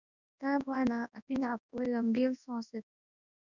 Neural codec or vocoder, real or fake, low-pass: codec, 24 kHz, 0.9 kbps, WavTokenizer, large speech release; fake; 7.2 kHz